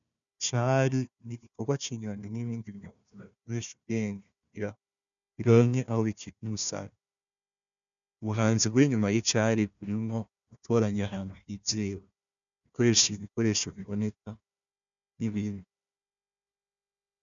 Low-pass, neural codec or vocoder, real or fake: 7.2 kHz; codec, 16 kHz, 1 kbps, FunCodec, trained on Chinese and English, 50 frames a second; fake